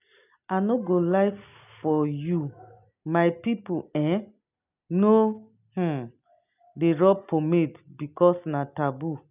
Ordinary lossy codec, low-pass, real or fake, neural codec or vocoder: none; 3.6 kHz; real; none